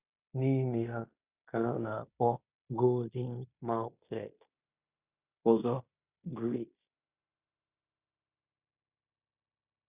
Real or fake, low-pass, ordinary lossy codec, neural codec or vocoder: fake; 3.6 kHz; Opus, 64 kbps; codec, 16 kHz in and 24 kHz out, 0.9 kbps, LongCat-Audio-Codec, fine tuned four codebook decoder